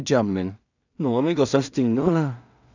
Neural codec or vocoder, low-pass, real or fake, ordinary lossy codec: codec, 16 kHz in and 24 kHz out, 0.4 kbps, LongCat-Audio-Codec, two codebook decoder; 7.2 kHz; fake; none